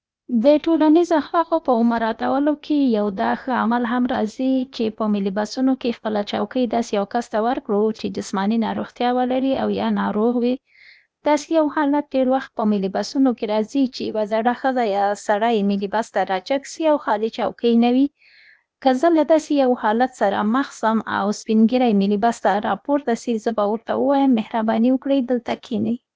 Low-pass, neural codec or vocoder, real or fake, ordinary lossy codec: none; codec, 16 kHz, 0.8 kbps, ZipCodec; fake; none